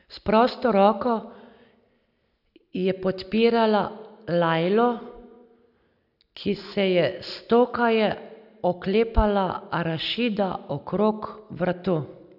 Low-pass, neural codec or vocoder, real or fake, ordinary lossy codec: 5.4 kHz; none; real; none